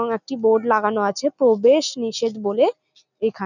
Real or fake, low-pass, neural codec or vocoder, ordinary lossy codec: real; 7.2 kHz; none; none